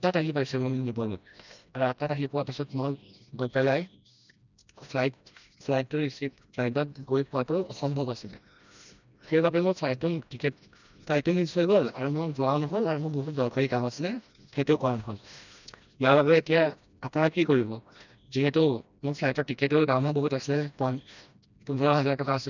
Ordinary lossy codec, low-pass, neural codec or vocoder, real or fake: none; 7.2 kHz; codec, 16 kHz, 1 kbps, FreqCodec, smaller model; fake